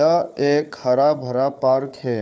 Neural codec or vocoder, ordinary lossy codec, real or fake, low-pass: codec, 16 kHz, 4 kbps, FunCodec, trained on Chinese and English, 50 frames a second; none; fake; none